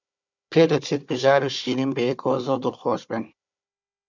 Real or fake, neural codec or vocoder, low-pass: fake; codec, 16 kHz, 4 kbps, FunCodec, trained on Chinese and English, 50 frames a second; 7.2 kHz